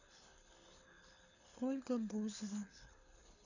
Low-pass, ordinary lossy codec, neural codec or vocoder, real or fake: 7.2 kHz; none; codec, 24 kHz, 6 kbps, HILCodec; fake